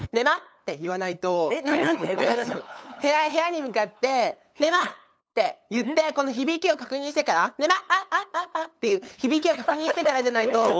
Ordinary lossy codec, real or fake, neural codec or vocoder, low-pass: none; fake; codec, 16 kHz, 8 kbps, FunCodec, trained on LibriTTS, 25 frames a second; none